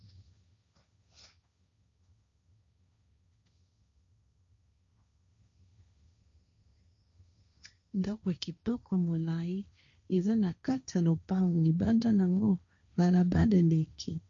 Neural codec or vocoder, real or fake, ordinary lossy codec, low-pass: codec, 16 kHz, 1.1 kbps, Voila-Tokenizer; fake; MP3, 64 kbps; 7.2 kHz